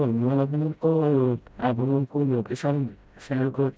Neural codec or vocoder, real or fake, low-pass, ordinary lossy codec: codec, 16 kHz, 0.5 kbps, FreqCodec, smaller model; fake; none; none